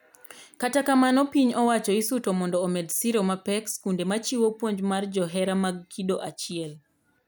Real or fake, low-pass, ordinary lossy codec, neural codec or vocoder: real; none; none; none